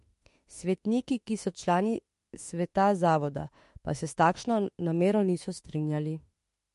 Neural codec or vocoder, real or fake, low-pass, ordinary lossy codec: autoencoder, 48 kHz, 32 numbers a frame, DAC-VAE, trained on Japanese speech; fake; 14.4 kHz; MP3, 48 kbps